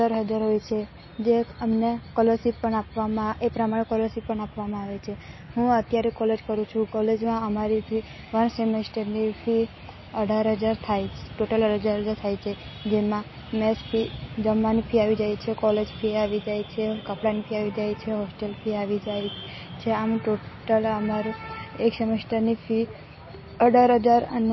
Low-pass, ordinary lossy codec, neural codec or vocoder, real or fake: 7.2 kHz; MP3, 24 kbps; none; real